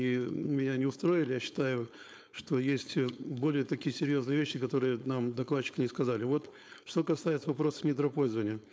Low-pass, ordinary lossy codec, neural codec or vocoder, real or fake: none; none; codec, 16 kHz, 4.8 kbps, FACodec; fake